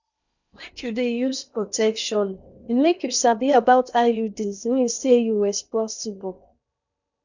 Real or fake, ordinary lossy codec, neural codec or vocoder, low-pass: fake; none; codec, 16 kHz in and 24 kHz out, 0.8 kbps, FocalCodec, streaming, 65536 codes; 7.2 kHz